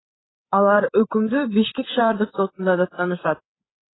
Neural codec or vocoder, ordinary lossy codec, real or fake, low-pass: codec, 16 kHz, 8 kbps, FreqCodec, larger model; AAC, 16 kbps; fake; 7.2 kHz